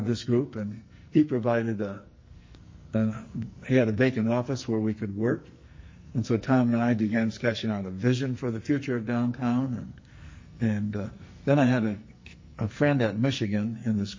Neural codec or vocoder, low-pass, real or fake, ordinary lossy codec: codec, 44.1 kHz, 2.6 kbps, SNAC; 7.2 kHz; fake; MP3, 32 kbps